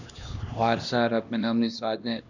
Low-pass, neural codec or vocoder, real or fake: 7.2 kHz; codec, 16 kHz, 1 kbps, X-Codec, HuBERT features, trained on LibriSpeech; fake